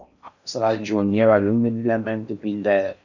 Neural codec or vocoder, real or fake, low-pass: codec, 16 kHz in and 24 kHz out, 0.6 kbps, FocalCodec, streaming, 4096 codes; fake; 7.2 kHz